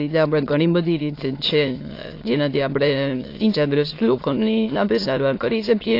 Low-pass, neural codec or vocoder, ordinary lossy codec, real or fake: 5.4 kHz; autoencoder, 22.05 kHz, a latent of 192 numbers a frame, VITS, trained on many speakers; AAC, 32 kbps; fake